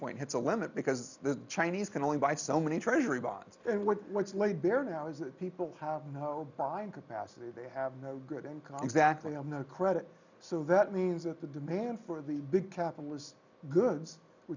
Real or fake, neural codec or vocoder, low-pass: real; none; 7.2 kHz